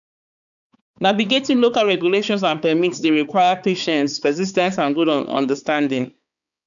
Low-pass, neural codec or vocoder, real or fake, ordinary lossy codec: 7.2 kHz; codec, 16 kHz, 4 kbps, X-Codec, HuBERT features, trained on balanced general audio; fake; none